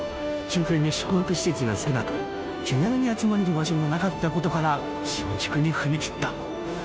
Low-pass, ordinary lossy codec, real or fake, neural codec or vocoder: none; none; fake; codec, 16 kHz, 0.5 kbps, FunCodec, trained on Chinese and English, 25 frames a second